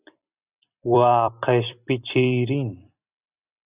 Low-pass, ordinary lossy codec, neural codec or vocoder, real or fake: 3.6 kHz; Opus, 64 kbps; vocoder, 44.1 kHz, 128 mel bands every 256 samples, BigVGAN v2; fake